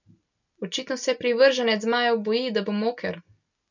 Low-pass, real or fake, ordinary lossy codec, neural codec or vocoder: 7.2 kHz; real; none; none